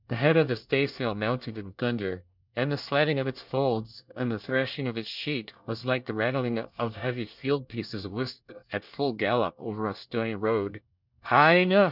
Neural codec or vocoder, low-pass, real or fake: codec, 24 kHz, 1 kbps, SNAC; 5.4 kHz; fake